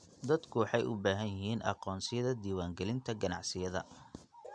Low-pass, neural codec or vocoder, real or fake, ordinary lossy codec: 9.9 kHz; none; real; none